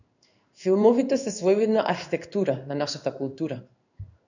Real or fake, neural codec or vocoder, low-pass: fake; codec, 16 kHz in and 24 kHz out, 1 kbps, XY-Tokenizer; 7.2 kHz